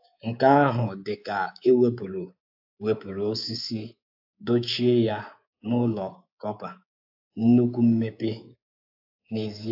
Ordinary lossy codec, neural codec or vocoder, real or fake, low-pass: AAC, 48 kbps; codec, 24 kHz, 3.1 kbps, DualCodec; fake; 5.4 kHz